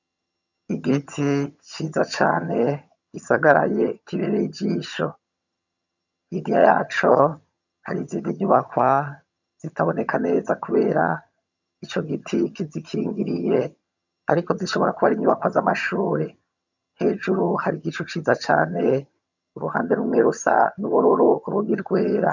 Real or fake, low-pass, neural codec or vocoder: fake; 7.2 kHz; vocoder, 22.05 kHz, 80 mel bands, HiFi-GAN